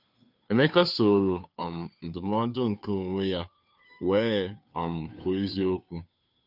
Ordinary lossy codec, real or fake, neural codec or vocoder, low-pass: none; fake; codec, 16 kHz, 2 kbps, FunCodec, trained on Chinese and English, 25 frames a second; 5.4 kHz